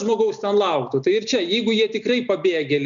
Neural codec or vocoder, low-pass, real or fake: none; 7.2 kHz; real